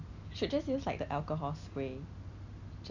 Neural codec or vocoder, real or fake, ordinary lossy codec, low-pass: none; real; none; 7.2 kHz